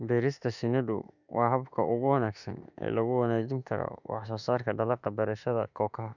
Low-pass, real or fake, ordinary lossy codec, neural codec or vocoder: 7.2 kHz; fake; none; autoencoder, 48 kHz, 32 numbers a frame, DAC-VAE, trained on Japanese speech